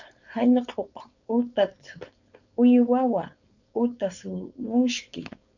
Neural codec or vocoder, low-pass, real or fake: codec, 16 kHz, 4.8 kbps, FACodec; 7.2 kHz; fake